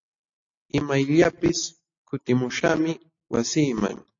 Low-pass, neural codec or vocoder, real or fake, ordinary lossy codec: 7.2 kHz; none; real; AAC, 48 kbps